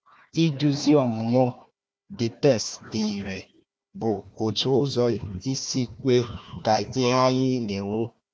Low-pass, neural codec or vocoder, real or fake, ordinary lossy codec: none; codec, 16 kHz, 1 kbps, FunCodec, trained on Chinese and English, 50 frames a second; fake; none